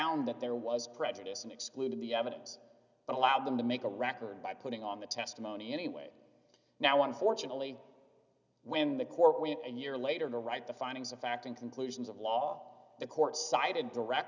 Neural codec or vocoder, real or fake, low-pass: none; real; 7.2 kHz